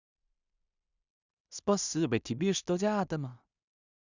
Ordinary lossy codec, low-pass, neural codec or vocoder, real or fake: none; 7.2 kHz; codec, 16 kHz in and 24 kHz out, 0.4 kbps, LongCat-Audio-Codec, two codebook decoder; fake